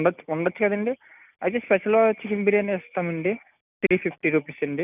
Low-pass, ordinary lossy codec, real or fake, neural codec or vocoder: 3.6 kHz; none; real; none